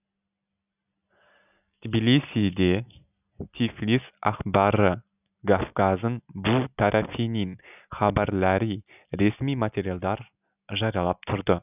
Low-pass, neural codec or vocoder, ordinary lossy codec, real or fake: 3.6 kHz; none; none; real